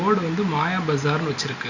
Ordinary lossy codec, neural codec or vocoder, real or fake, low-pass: Opus, 64 kbps; none; real; 7.2 kHz